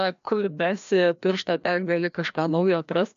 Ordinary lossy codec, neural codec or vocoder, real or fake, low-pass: MP3, 64 kbps; codec, 16 kHz, 1 kbps, FreqCodec, larger model; fake; 7.2 kHz